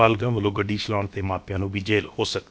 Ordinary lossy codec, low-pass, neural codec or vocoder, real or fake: none; none; codec, 16 kHz, about 1 kbps, DyCAST, with the encoder's durations; fake